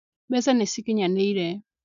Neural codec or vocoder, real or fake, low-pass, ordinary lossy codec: none; real; 7.2 kHz; AAC, 96 kbps